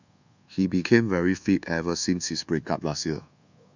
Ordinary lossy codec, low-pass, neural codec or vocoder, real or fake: none; 7.2 kHz; codec, 24 kHz, 1.2 kbps, DualCodec; fake